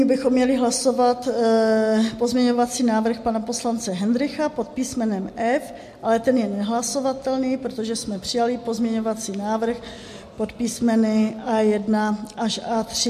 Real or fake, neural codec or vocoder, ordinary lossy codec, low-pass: real; none; MP3, 64 kbps; 14.4 kHz